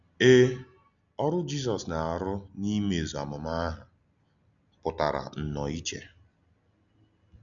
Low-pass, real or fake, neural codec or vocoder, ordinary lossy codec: 7.2 kHz; real; none; none